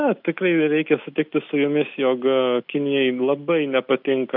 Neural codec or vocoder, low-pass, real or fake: none; 5.4 kHz; real